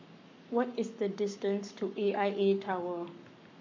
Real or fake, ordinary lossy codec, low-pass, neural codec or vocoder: fake; MP3, 64 kbps; 7.2 kHz; codec, 44.1 kHz, 7.8 kbps, Pupu-Codec